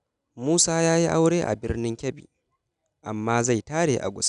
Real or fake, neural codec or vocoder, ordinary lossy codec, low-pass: real; none; none; 10.8 kHz